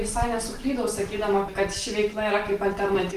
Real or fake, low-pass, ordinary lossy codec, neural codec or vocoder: real; 14.4 kHz; Opus, 24 kbps; none